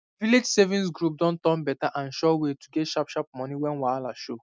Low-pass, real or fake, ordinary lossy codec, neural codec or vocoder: none; real; none; none